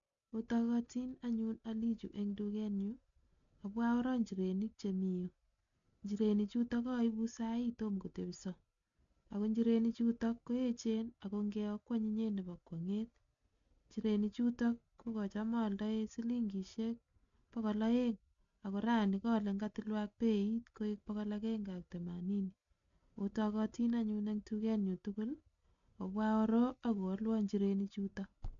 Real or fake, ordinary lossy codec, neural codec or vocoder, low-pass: real; none; none; 7.2 kHz